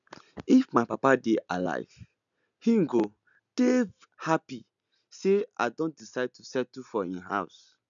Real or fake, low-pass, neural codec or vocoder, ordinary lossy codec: real; 7.2 kHz; none; none